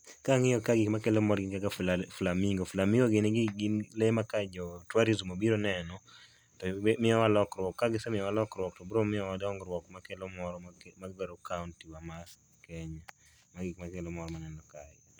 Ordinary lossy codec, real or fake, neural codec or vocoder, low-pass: none; real; none; none